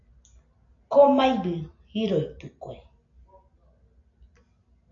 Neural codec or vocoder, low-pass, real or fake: none; 7.2 kHz; real